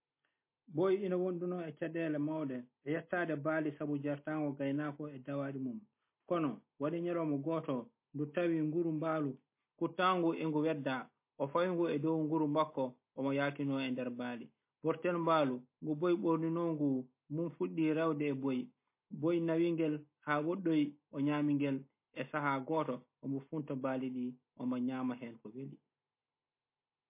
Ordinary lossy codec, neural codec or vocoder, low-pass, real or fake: MP3, 24 kbps; none; 3.6 kHz; real